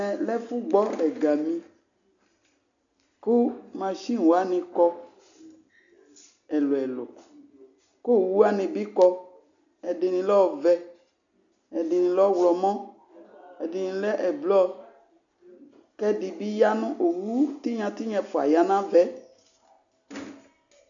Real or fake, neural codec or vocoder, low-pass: real; none; 7.2 kHz